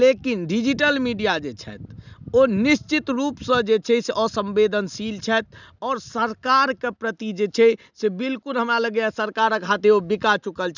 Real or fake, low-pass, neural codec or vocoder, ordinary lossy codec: real; 7.2 kHz; none; none